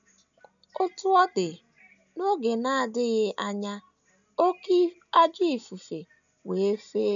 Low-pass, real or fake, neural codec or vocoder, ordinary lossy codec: 7.2 kHz; real; none; none